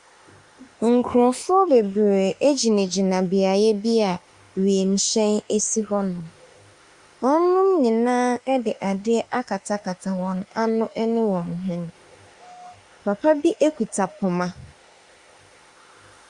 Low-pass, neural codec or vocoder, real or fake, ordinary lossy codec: 10.8 kHz; autoencoder, 48 kHz, 32 numbers a frame, DAC-VAE, trained on Japanese speech; fake; Opus, 64 kbps